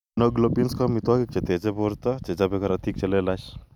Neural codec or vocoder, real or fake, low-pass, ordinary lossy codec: none; real; 19.8 kHz; none